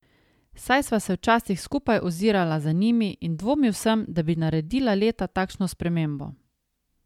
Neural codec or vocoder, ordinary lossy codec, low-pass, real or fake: none; MP3, 96 kbps; 19.8 kHz; real